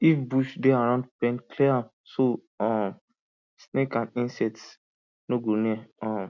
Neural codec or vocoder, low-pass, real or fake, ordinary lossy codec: none; 7.2 kHz; real; none